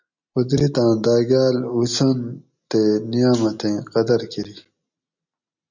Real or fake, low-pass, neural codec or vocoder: real; 7.2 kHz; none